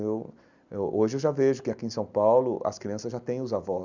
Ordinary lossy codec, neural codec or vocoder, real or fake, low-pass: none; none; real; 7.2 kHz